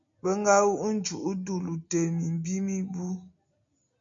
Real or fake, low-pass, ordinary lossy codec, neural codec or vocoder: real; 7.2 kHz; MP3, 48 kbps; none